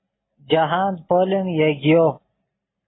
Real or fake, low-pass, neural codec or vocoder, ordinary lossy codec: real; 7.2 kHz; none; AAC, 16 kbps